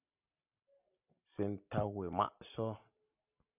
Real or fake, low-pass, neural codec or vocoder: real; 3.6 kHz; none